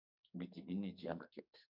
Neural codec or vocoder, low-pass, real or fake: codec, 44.1 kHz, 2.6 kbps, SNAC; 5.4 kHz; fake